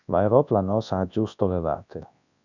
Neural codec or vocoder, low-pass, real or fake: codec, 24 kHz, 0.9 kbps, WavTokenizer, large speech release; 7.2 kHz; fake